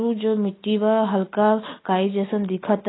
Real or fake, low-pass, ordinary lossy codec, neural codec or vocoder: real; 7.2 kHz; AAC, 16 kbps; none